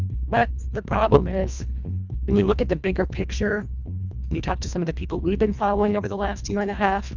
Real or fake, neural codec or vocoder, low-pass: fake; codec, 24 kHz, 1.5 kbps, HILCodec; 7.2 kHz